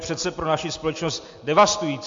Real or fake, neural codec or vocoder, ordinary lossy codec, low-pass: real; none; MP3, 48 kbps; 7.2 kHz